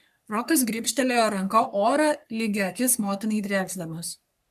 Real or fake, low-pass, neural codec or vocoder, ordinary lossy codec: fake; 14.4 kHz; codec, 44.1 kHz, 3.4 kbps, Pupu-Codec; AAC, 96 kbps